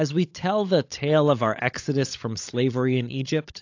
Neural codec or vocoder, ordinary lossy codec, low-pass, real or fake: codec, 16 kHz, 16 kbps, FunCodec, trained on LibriTTS, 50 frames a second; AAC, 48 kbps; 7.2 kHz; fake